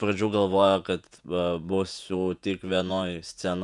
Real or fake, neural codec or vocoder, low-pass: fake; vocoder, 48 kHz, 128 mel bands, Vocos; 10.8 kHz